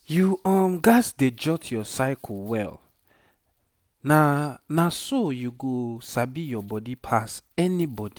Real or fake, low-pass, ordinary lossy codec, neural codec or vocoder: real; none; none; none